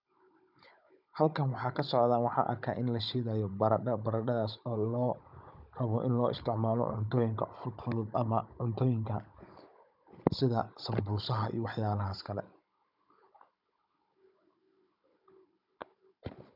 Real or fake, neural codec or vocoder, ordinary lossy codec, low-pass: fake; codec, 16 kHz, 16 kbps, FunCodec, trained on Chinese and English, 50 frames a second; none; 5.4 kHz